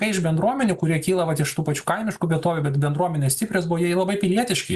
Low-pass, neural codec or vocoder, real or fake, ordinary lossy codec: 14.4 kHz; none; real; Opus, 64 kbps